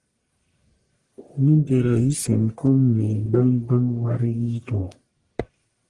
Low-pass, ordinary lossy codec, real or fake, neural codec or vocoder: 10.8 kHz; Opus, 24 kbps; fake; codec, 44.1 kHz, 1.7 kbps, Pupu-Codec